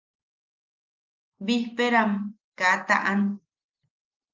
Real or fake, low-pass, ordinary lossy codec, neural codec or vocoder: real; 7.2 kHz; Opus, 24 kbps; none